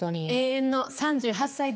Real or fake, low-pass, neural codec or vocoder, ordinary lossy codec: fake; none; codec, 16 kHz, 4 kbps, X-Codec, HuBERT features, trained on general audio; none